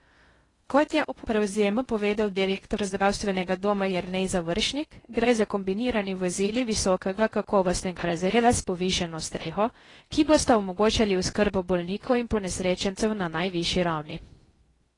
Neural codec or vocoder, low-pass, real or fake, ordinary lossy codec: codec, 16 kHz in and 24 kHz out, 0.6 kbps, FocalCodec, streaming, 2048 codes; 10.8 kHz; fake; AAC, 32 kbps